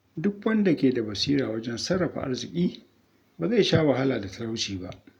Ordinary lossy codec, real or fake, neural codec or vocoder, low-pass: none; real; none; 19.8 kHz